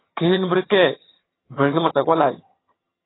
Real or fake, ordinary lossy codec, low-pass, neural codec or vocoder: fake; AAC, 16 kbps; 7.2 kHz; vocoder, 22.05 kHz, 80 mel bands, HiFi-GAN